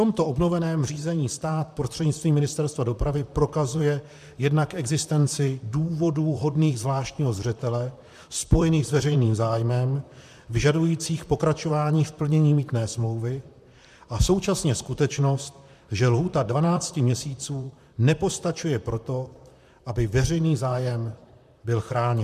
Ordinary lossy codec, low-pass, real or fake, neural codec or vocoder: MP3, 96 kbps; 14.4 kHz; fake; vocoder, 44.1 kHz, 128 mel bands, Pupu-Vocoder